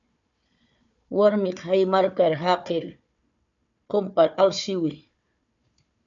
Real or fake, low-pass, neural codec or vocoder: fake; 7.2 kHz; codec, 16 kHz, 4 kbps, FunCodec, trained on Chinese and English, 50 frames a second